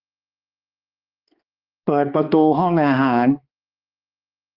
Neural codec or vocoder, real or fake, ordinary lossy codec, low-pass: codec, 16 kHz, 2 kbps, X-Codec, HuBERT features, trained on balanced general audio; fake; Opus, 32 kbps; 5.4 kHz